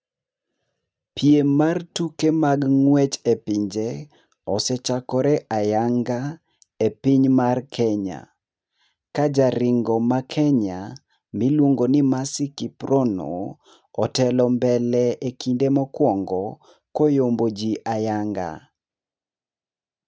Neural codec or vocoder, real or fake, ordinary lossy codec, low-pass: none; real; none; none